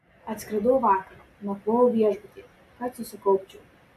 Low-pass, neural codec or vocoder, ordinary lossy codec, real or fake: 14.4 kHz; none; AAC, 96 kbps; real